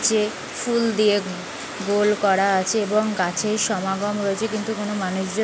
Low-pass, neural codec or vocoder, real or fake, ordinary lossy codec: none; none; real; none